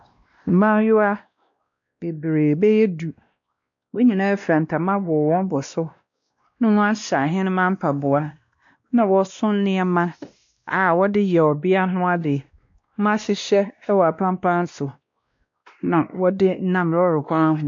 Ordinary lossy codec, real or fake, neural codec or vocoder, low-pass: MP3, 64 kbps; fake; codec, 16 kHz, 1 kbps, X-Codec, WavLM features, trained on Multilingual LibriSpeech; 7.2 kHz